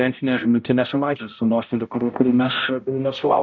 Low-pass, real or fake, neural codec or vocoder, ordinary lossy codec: 7.2 kHz; fake; codec, 16 kHz, 0.5 kbps, X-Codec, HuBERT features, trained on balanced general audio; MP3, 64 kbps